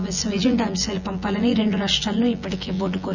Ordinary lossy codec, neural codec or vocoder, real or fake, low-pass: none; vocoder, 24 kHz, 100 mel bands, Vocos; fake; 7.2 kHz